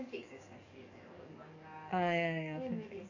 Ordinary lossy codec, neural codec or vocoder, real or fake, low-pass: none; codec, 44.1 kHz, 7.8 kbps, DAC; fake; 7.2 kHz